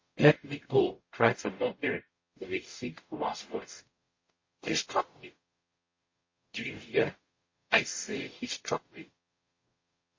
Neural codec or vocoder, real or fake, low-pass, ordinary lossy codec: codec, 44.1 kHz, 0.9 kbps, DAC; fake; 7.2 kHz; MP3, 32 kbps